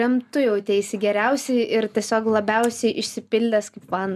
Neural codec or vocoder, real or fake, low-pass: none; real; 14.4 kHz